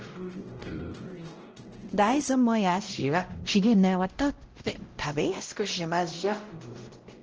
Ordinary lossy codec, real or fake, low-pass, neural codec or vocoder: Opus, 16 kbps; fake; 7.2 kHz; codec, 16 kHz, 0.5 kbps, X-Codec, WavLM features, trained on Multilingual LibriSpeech